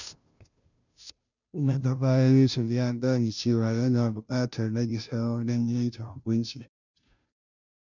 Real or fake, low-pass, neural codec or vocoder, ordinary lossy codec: fake; 7.2 kHz; codec, 16 kHz, 0.5 kbps, FunCodec, trained on Chinese and English, 25 frames a second; none